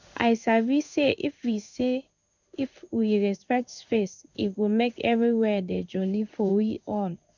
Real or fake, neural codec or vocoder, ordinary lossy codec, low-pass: fake; codec, 16 kHz in and 24 kHz out, 1 kbps, XY-Tokenizer; none; 7.2 kHz